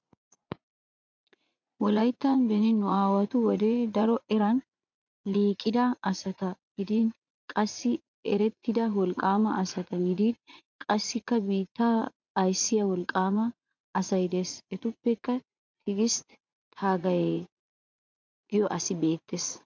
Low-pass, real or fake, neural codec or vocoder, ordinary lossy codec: 7.2 kHz; fake; vocoder, 44.1 kHz, 80 mel bands, Vocos; MP3, 64 kbps